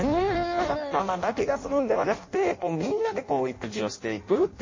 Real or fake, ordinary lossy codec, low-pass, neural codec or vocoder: fake; MP3, 32 kbps; 7.2 kHz; codec, 16 kHz in and 24 kHz out, 0.6 kbps, FireRedTTS-2 codec